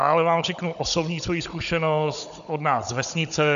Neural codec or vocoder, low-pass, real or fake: codec, 16 kHz, 16 kbps, FunCodec, trained on Chinese and English, 50 frames a second; 7.2 kHz; fake